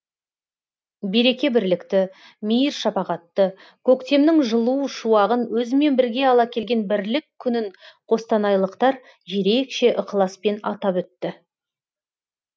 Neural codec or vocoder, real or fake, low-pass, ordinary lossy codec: none; real; none; none